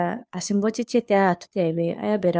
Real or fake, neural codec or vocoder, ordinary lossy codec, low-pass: fake; codec, 16 kHz, 2 kbps, FunCodec, trained on Chinese and English, 25 frames a second; none; none